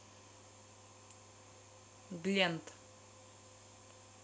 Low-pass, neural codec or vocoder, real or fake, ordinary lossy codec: none; none; real; none